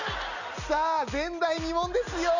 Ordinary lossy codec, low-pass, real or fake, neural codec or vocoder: none; 7.2 kHz; real; none